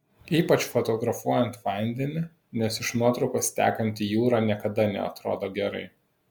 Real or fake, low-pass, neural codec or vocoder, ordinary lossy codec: real; 19.8 kHz; none; MP3, 96 kbps